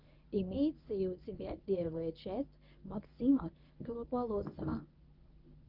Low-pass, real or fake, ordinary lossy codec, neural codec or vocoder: 5.4 kHz; fake; Opus, 24 kbps; codec, 24 kHz, 0.9 kbps, WavTokenizer, medium speech release version 1